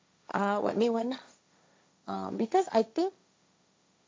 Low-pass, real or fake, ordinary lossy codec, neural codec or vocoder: none; fake; none; codec, 16 kHz, 1.1 kbps, Voila-Tokenizer